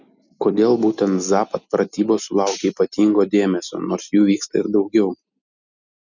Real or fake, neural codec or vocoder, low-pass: real; none; 7.2 kHz